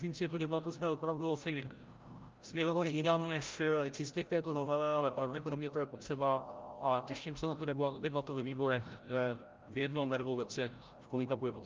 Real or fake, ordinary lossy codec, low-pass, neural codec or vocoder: fake; Opus, 24 kbps; 7.2 kHz; codec, 16 kHz, 0.5 kbps, FreqCodec, larger model